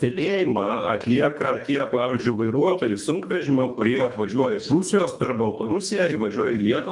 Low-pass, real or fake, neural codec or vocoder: 10.8 kHz; fake; codec, 24 kHz, 1.5 kbps, HILCodec